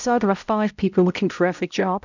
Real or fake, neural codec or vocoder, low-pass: fake; codec, 16 kHz, 0.5 kbps, X-Codec, HuBERT features, trained on balanced general audio; 7.2 kHz